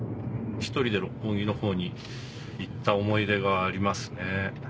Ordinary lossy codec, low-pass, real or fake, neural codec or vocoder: none; none; real; none